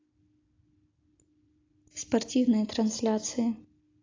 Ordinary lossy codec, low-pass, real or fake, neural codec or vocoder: AAC, 32 kbps; 7.2 kHz; real; none